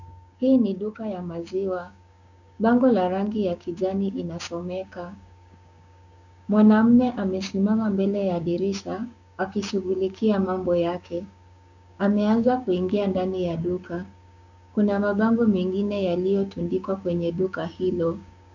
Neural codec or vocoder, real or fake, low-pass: codec, 16 kHz, 6 kbps, DAC; fake; 7.2 kHz